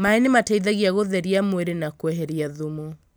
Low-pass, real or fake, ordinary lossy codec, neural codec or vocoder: none; real; none; none